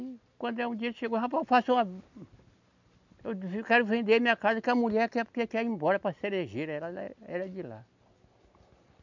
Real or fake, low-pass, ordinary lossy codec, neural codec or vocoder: real; 7.2 kHz; none; none